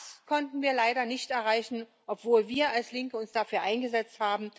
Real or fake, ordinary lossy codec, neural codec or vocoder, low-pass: real; none; none; none